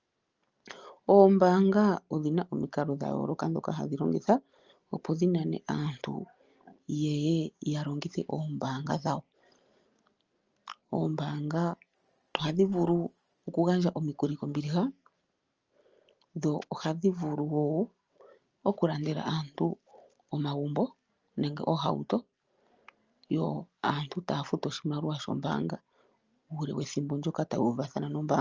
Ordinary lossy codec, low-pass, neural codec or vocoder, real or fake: Opus, 24 kbps; 7.2 kHz; none; real